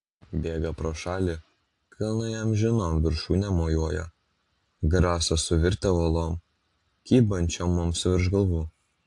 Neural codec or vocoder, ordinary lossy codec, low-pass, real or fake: none; AAC, 64 kbps; 10.8 kHz; real